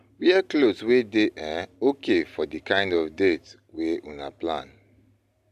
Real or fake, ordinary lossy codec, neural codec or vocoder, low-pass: real; AAC, 96 kbps; none; 14.4 kHz